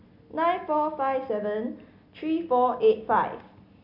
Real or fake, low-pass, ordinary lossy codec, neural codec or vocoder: real; 5.4 kHz; none; none